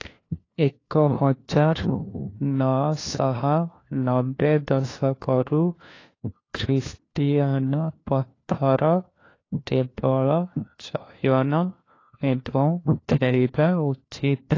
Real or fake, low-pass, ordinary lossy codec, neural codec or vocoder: fake; 7.2 kHz; AAC, 32 kbps; codec, 16 kHz, 1 kbps, FunCodec, trained on LibriTTS, 50 frames a second